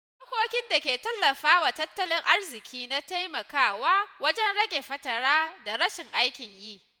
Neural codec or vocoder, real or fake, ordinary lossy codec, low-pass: vocoder, 48 kHz, 128 mel bands, Vocos; fake; none; none